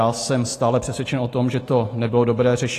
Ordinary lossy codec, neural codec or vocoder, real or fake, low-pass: AAC, 48 kbps; none; real; 14.4 kHz